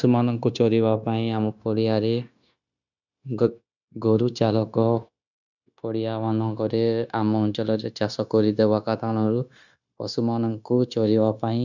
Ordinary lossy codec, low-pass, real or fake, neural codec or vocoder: none; 7.2 kHz; fake; codec, 16 kHz, 0.9 kbps, LongCat-Audio-Codec